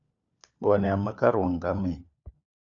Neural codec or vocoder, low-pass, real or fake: codec, 16 kHz, 4 kbps, FunCodec, trained on LibriTTS, 50 frames a second; 7.2 kHz; fake